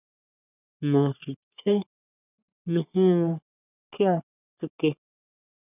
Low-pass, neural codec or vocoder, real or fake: 3.6 kHz; codec, 44.1 kHz, 3.4 kbps, Pupu-Codec; fake